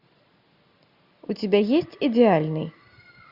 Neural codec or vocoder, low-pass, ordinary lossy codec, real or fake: none; 5.4 kHz; Opus, 64 kbps; real